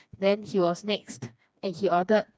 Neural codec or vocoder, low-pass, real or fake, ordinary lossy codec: codec, 16 kHz, 2 kbps, FreqCodec, smaller model; none; fake; none